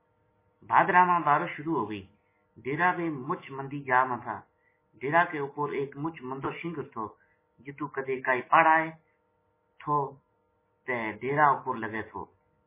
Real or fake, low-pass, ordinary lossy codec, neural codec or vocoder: real; 3.6 kHz; MP3, 16 kbps; none